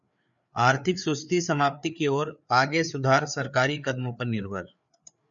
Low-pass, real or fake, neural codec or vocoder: 7.2 kHz; fake; codec, 16 kHz, 4 kbps, FreqCodec, larger model